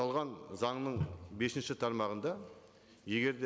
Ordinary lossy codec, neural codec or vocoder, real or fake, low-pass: none; none; real; none